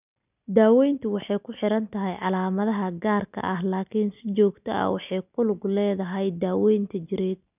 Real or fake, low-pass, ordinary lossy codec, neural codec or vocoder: real; 3.6 kHz; none; none